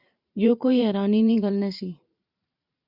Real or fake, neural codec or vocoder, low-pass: fake; vocoder, 44.1 kHz, 128 mel bands every 512 samples, BigVGAN v2; 5.4 kHz